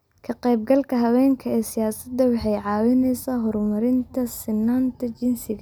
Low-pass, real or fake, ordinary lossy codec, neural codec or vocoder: none; real; none; none